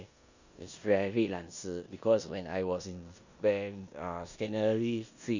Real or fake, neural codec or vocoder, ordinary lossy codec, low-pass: fake; codec, 16 kHz in and 24 kHz out, 0.9 kbps, LongCat-Audio-Codec, four codebook decoder; none; 7.2 kHz